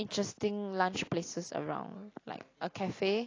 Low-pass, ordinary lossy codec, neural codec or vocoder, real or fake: 7.2 kHz; AAC, 32 kbps; none; real